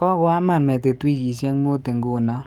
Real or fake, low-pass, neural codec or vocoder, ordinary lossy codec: fake; 19.8 kHz; codec, 44.1 kHz, 7.8 kbps, DAC; Opus, 32 kbps